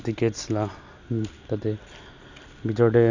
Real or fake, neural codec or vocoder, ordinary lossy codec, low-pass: real; none; Opus, 64 kbps; 7.2 kHz